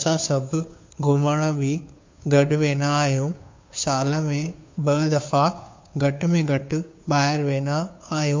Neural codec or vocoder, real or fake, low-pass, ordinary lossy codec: codec, 16 kHz, 2 kbps, FunCodec, trained on Chinese and English, 25 frames a second; fake; 7.2 kHz; MP3, 48 kbps